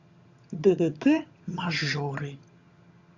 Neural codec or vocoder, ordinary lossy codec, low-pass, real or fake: vocoder, 22.05 kHz, 80 mel bands, HiFi-GAN; Opus, 64 kbps; 7.2 kHz; fake